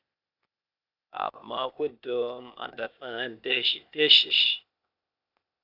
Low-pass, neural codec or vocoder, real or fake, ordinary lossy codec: 5.4 kHz; codec, 16 kHz, 0.8 kbps, ZipCodec; fake; AAC, 48 kbps